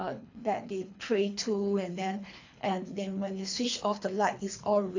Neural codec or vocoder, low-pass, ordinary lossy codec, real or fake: codec, 24 kHz, 3 kbps, HILCodec; 7.2 kHz; AAC, 48 kbps; fake